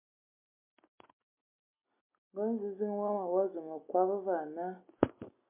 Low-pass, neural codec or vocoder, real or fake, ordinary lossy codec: 3.6 kHz; none; real; MP3, 32 kbps